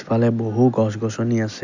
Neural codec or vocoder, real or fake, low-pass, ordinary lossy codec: none; real; 7.2 kHz; none